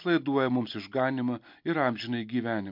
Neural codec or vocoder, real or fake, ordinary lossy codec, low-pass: none; real; MP3, 48 kbps; 5.4 kHz